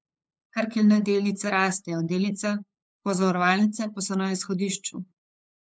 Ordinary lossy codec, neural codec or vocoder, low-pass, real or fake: none; codec, 16 kHz, 8 kbps, FunCodec, trained on LibriTTS, 25 frames a second; none; fake